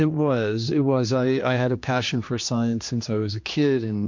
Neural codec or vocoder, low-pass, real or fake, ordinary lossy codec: codec, 16 kHz, 2 kbps, X-Codec, HuBERT features, trained on general audio; 7.2 kHz; fake; MP3, 48 kbps